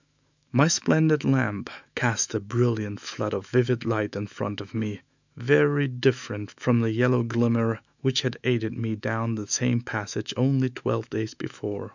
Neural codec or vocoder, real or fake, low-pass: autoencoder, 48 kHz, 128 numbers a frame, DAC-VAE, trained on Japanese speech; fake; 7.2 kHz